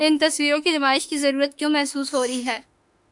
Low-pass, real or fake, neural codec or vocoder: 10.8 kHz; fake; autoencoder, 48 kHz, 32 numbers a frame, DAC-VAE, trained on Japanese speech